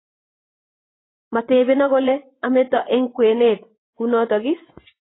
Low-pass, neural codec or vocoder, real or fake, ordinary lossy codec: 7.2 kHz; vocoder, 24 kHz, 100 mel bands, Vocos; fake; AAC, 16 kbps